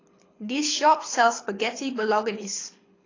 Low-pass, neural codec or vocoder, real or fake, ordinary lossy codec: 7.2 kHz; codec, 24 kHz, 6 kbps, HILCodec; fake; AAC, 32 kbps